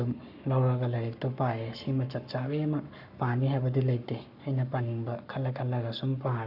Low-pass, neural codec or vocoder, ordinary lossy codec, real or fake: 5.4 kHz; codec, 44.1 kHz, 7.8 kbps, Pupu-Codec; none; fake